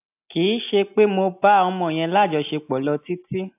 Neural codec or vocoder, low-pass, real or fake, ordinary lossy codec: none; 3.6 kHz; real; AAC, 32 kbps